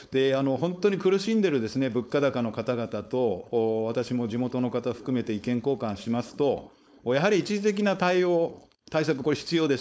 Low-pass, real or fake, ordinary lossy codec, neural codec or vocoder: none; fake; none; codec, 16 kHz, 4.8 kbps, FACodec